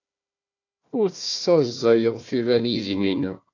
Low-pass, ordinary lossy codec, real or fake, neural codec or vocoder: 7.2 kHz; AAC, 48 kbps; fake; codec, 16 kHz, 1 kbps, FunCodec, trained on Chinese and English, 50 frames a second